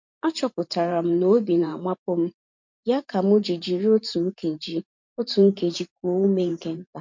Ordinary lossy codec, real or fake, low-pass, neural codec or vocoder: MP3, 48 kbps; fake; 7.2 kHz; vocoder, 44.1 kHz, 128 mel bands every 512 samples, BigVGAN v2